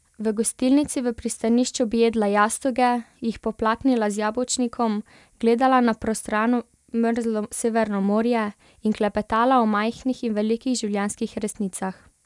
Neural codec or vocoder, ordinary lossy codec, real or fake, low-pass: none; none; real; none